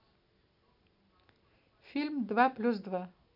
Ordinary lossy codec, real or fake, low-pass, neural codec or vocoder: none; real; 5.4 kHz; none